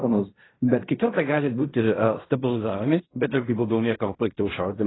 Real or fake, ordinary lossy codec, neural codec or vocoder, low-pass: fake; AAC, 16 kbps; codec, 16 kHz in and 24 kHz out, 0.4 kbps, LongCat-Audio-Codec, fine tuned four codebook decoder; 7.2 kHz